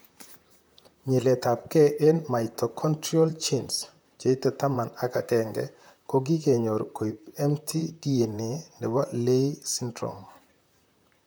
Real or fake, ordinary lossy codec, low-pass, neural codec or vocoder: fake; none; none; vocoder, 44.1 kHz, 128 mel bands, Pupu-Vocoder